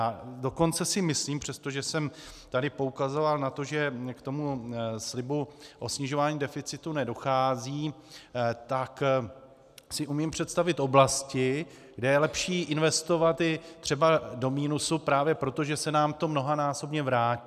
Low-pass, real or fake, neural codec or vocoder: 14.4 kHz; real; none